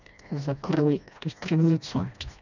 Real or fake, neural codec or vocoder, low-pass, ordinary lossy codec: fake; codec, 16 kHz, 1 kbps, FreqCodec, smaller model; 7.2 kHz; none